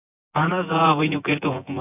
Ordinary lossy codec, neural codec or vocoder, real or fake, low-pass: AAC, 24 kbps; vocoder, 24 kHz, 100 mel bands, Vocos; fake; 3.6 kHz